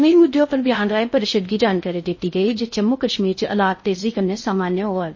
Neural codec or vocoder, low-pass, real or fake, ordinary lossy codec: codec, 16 kHz in and 24 kHz out, 0.6 kbps, FocalCodec, streaming, 4096 codes; 7.2 kHz; fake; MP3, 32 kbps